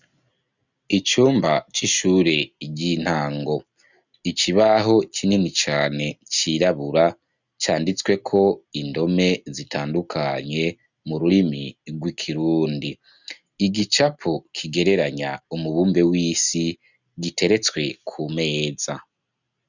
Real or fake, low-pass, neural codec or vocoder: real; 7.2 kHz; none